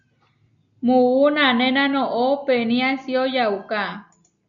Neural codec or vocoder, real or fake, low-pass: none; real; 7.2 kHz